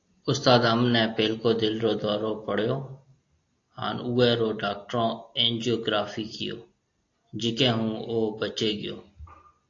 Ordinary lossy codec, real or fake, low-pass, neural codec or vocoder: AAC, 48 kbps; real; 7.2 kHz; none